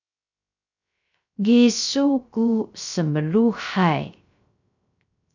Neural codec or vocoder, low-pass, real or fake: codec, 16 kHz, 0.3 kbps, FocalCodec; 7.2 kHz; fake